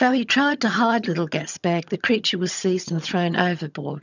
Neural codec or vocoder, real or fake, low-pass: vocoder, 22.05 kHz, 80 mel bands, HiFi-GAN; fake; 7.2 kHz